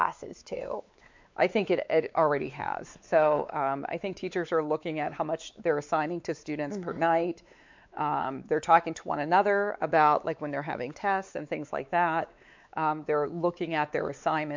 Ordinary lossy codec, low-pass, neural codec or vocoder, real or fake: MP3, 64 kbps; 7.2 kHz; codec, 16 kHz, 4 kbps, X-Codec, WavLM features, trained on Multilingual LibriSpeech; fake